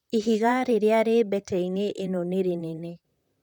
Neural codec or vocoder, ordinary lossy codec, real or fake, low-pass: vocoder, 44.1 kHz, 128 mel bands, Pupu-Vocoder; none; fake; 19.8 kHz